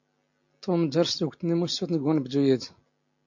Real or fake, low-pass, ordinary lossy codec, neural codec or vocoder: real; 7.2 kHz; MP3, 48 kbps; none